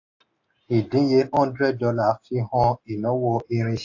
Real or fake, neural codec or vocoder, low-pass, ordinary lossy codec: real; none; 7.2 kHz; AAC, 48 kbps